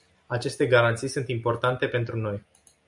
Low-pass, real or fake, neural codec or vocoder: 10.8 kHz; real; none